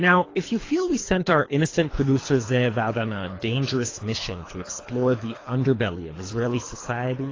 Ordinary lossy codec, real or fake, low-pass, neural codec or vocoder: AAC, 32 kbps; fake; 7.2 kHz; codec, 24 kHz, 3 kbps, HILCodec